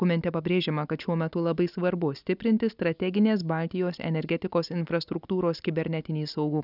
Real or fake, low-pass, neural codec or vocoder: real; 5.4 kHz; none